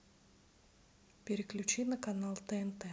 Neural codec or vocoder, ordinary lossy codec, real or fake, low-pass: none; none; real; none